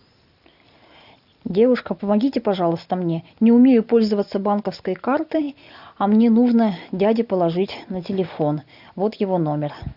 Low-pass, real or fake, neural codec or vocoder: 5.4 kHz; real; none